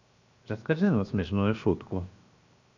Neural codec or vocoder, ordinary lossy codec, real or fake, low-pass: codec, 16 kHz, 0.7 kbps, FocalCodec; none; fake; 7.2 kHz